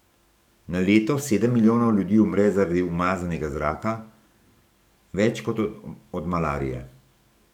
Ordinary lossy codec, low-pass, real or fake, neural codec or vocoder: none; 19.8 kHz; fake; codec, 44.1 kHz, 7.8 kbps, DAC